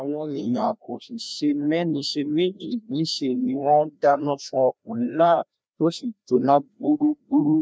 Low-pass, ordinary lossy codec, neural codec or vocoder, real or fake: none; none; codec, 16 kHz, 1 kbps, FreqCodec, larger model; fake